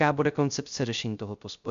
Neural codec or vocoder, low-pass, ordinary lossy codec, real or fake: codec, 16 kHz, 0.3 kbps, FocalCodec; 7.2 kHz; MP3, 64 kbps; fake